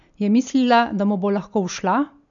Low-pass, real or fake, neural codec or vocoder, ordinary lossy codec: 7.2 kHz; real; none; none